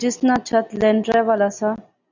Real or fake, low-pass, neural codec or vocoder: real; 7.2 kHz; none